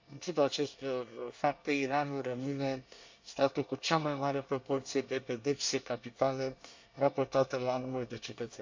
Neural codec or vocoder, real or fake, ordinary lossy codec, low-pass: codec, 24 kHz, 1 kbps, SNAC; fake; MP3, 64 kbps; 7.2 kHz